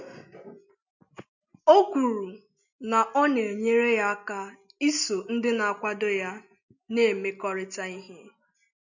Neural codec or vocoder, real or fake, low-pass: none; real; 7.2 kHz